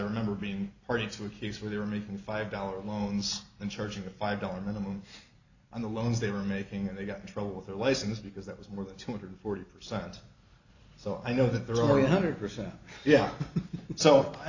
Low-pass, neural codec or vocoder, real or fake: 7.2 kHz; none; real